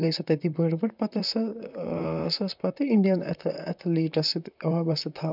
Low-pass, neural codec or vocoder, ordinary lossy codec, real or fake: 5.4 kHz; vocoder, 44.1 kHz, 128 mel bands, Pupu-Vocoder; none; fake